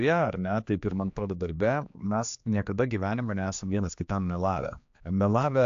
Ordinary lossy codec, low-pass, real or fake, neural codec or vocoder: AAC, 64 kbps; 7.2 kHz; fake; codec, 16 kHz, 2 kbps, X-Codec, HuBERT features, trained on general audio